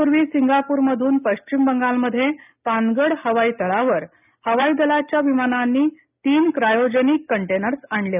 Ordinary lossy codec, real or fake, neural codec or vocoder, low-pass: none; real; none; 3.6 kHz